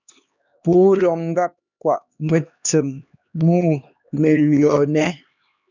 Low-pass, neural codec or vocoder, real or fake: 7.2 kHz; codec, 16 kHz, 2 kbps, X-Codec, HuBERT features, trained on LibriSpeech; fake